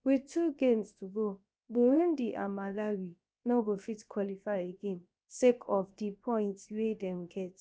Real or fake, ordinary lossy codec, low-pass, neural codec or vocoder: fake; none; none; codec, 16 kHz, 0.7 kbps, FocalCodec